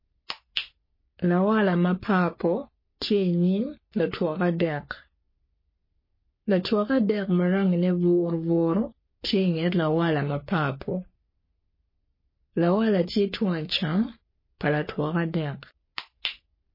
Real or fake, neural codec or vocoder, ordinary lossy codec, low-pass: fake; codec, 44.1 kHz, 3.4 kbps, Pupu-Codec; MP3, 24 kbps; 5.4 kHz